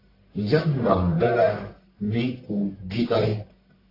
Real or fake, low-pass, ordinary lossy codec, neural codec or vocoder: fake; 5.4 kHz; AAC, 24 kbps; codec, 44.1 kHz, 1.7 kbps, Pupu-Codec